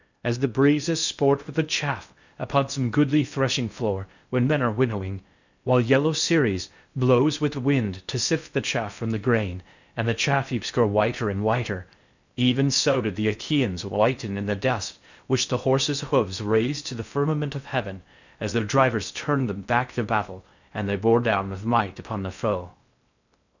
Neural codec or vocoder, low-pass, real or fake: codec, 16 kHz in and 24 kHz out, 0.6 kbps, FocalCodec, streaming, 2048 codes; 7.2 kHz; fake